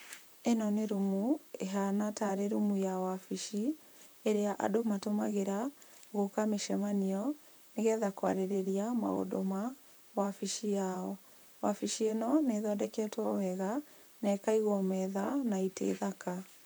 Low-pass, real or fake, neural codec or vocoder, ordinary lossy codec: none; fake; vocoder, 44.1 kHz, 128 mel bands, Pupu-Vocoder; none